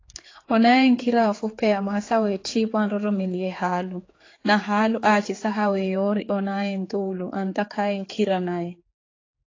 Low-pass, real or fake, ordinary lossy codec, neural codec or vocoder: 7.2 kHz; fake; AAC, 32 kbps; codec, 16 kHz, 4 kbps, X-Codec, HuBERT features, trained on general audio